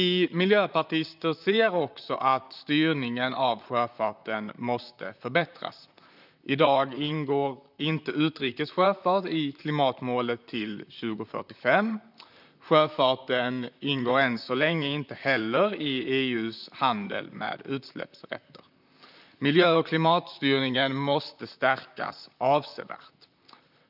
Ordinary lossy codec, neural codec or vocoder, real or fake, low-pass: none; vocoder, 44.1 kHz, 128 mel bands, Pupu-Vocoder; fake; 5.4 kHz